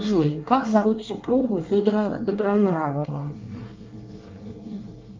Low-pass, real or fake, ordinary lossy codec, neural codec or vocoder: 7.2 kHz; fake; Opus, 24 kbps; codec, 24 kHz, 1 kbps, SNAC